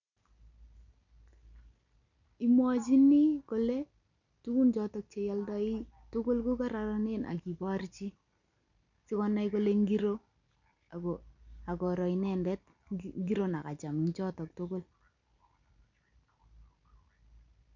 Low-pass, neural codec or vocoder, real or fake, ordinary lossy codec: 7.2 kHz; none; real; none